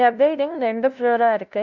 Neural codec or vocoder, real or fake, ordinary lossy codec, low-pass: codec, 16 kHz, 0.5 kbps, FunCodec, trained on LibriTTS, 25 frames a second; fake; none; 7.2 kHz